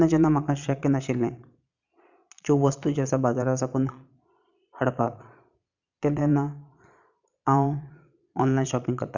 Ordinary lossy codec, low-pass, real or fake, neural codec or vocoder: none; 7.2 kHz; fake; vocoder, 22.05 kHz, 80 mel bands, Vocos